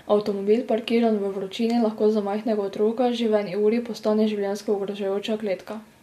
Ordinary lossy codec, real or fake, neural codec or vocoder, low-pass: MP3, 64 kbps; real; none; 14.4 kHz